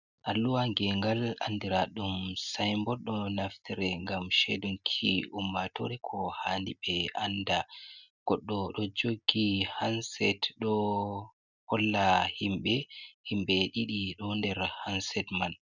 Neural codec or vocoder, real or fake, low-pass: none; real; 7.2 kHz